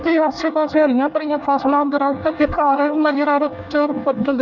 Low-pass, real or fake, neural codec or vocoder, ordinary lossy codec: 7.2 kHz; fake; codec, 24 kHz, 1 kbps, SNAC; none